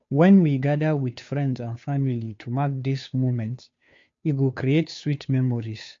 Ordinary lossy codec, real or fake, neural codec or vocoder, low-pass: MP3, 48 kbps; fake; codec, 16 kHz, 2 kbps, FunCodec, trained on Chinese and English, 25 frames a second; 7.2 kHz